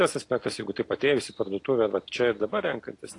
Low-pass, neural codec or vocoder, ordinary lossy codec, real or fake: 10.8 kHz; none; AAC, 48 kbps; real